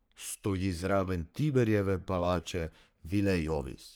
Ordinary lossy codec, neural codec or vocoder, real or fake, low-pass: none; codec, 44.1 kHz, 3.4 kbps, Pupu-Codec; fake; none